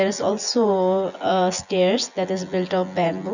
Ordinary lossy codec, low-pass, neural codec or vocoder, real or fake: none; 7.2 kHz; vocoder, 24 kHz, 100 mel bands, Vocos; fake